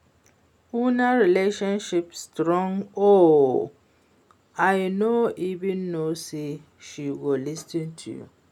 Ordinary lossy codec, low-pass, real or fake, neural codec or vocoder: none; 19.8 kHz; real; none